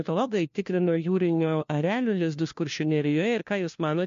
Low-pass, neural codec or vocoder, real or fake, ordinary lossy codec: 7.2 kHz; codec, 16 kHz, 1 kbps, FunCodec, trained on LibriTTS, 50 frames a second; fake; MP3, 48 kbps